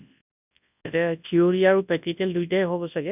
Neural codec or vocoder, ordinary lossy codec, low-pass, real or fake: codec, 24 kHz, 0.9 kbps, WavTokenizer, large speech release; none; 3.6 kHz; fake